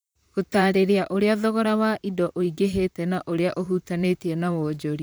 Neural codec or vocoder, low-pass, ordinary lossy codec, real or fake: vocoder, 44.1 kHz, 128 mel bands, Pupu-Vocoder; none; none; fake